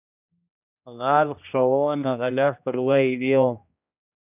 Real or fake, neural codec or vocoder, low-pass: fake; codec, 16 kHz, 1 kbps, X-Codec, HuBERT features, trained on general audio; 3.6 kHz